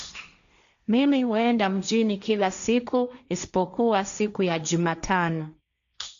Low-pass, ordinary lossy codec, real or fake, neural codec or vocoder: 7.2 kHz; none; fake; codec, 16 kHz, 1.1 kbps, Voila-Tokenizer